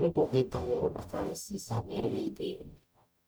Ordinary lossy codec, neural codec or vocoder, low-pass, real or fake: none; codec, 44.1 kHz, 0.9 kbps, DAC; none; fake